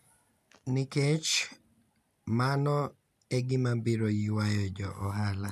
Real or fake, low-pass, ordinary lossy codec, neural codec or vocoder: real; 14.4 kHz; none; none